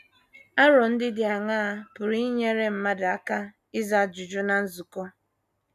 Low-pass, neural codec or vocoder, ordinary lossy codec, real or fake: 14.4 kHz; none; none; real